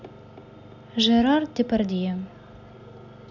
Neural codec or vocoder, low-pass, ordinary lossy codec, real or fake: none; 7.2 kHz; none; real